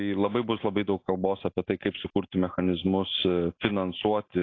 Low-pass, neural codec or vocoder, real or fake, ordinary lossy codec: 7.2 kHz; none; real; AAC, 32 kbps